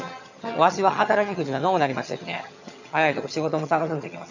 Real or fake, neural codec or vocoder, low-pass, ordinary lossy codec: fake; vocoder, 22.05 kHz, 80 mel bands, HiFi-GAN; 7.2 kHz; none